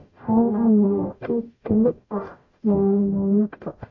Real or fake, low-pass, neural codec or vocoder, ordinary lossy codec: fake; 7.2 kHz; codec, 44.1 kHz, 0.9 kbps, DAC; MP3, 48 kbps